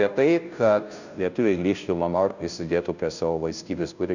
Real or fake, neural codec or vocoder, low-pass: fake; codec, 16 kHz, 0.5 kbps, FunCodec, trained on Chinese and English, 25 frames a second; 7.2 kHz